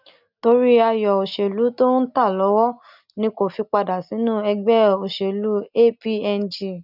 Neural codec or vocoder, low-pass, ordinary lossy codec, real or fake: none; 5.4 kHz; none; real